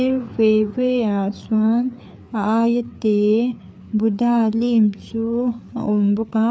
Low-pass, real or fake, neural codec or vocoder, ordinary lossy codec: none; fake; codec, 16 kHz, 4 kbps, FreqCodec, larger model; none